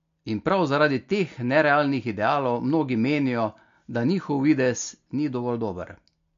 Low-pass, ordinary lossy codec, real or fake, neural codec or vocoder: 7.2 kHz; MP3, 48 kbps; real; none